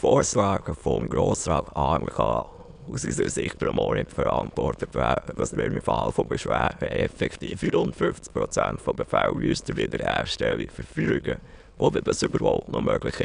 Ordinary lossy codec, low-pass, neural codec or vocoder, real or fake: none; 9.9 kHz; autoencoder, 22.05 kHz, a latent of 192 numbers a frame, VITS, trained on many speakers; fake